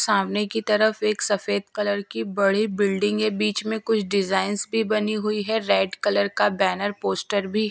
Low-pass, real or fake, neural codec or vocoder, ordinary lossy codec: none; real; none; none